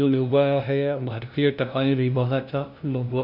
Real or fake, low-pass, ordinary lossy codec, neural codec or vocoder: fake; 5.4 kHz; none; codec, 16 kHz, 0.5 kbps, FunCodec, trained on LibriTTS, 25 frames a second